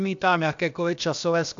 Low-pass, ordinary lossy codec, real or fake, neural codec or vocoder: 7.2 kHz; AAC, 64 kbps; fake; codec, 16 kHz, about 1 kbps, DyCAST, with the encoder's durations